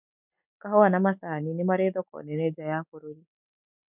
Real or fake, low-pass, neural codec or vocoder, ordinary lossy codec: real; 3.6 kHz; none; none